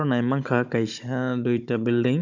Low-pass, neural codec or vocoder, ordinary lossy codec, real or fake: 7.2 kHz; codec, 16 kHz, 16 kbps, FunCodec, trained on Chinese and English, 50 frames a second; none; fake